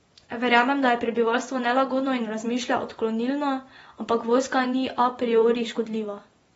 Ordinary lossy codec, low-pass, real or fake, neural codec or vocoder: AAC, 24 kbps; 10.8 kHz; fake; vocoder, 24 kHz, 100 mel bands, Vocos